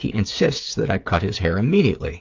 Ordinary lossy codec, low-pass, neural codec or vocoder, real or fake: AAC, 48 kbps; 7.2 kHz; codec, 16 kHz, 8 kbps, FreqCodec, smaller model; fake